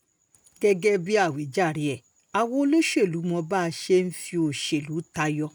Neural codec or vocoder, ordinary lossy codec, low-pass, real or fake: none; none; none; real